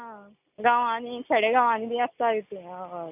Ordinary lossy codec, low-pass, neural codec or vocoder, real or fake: none; 3.6 kHz; none; real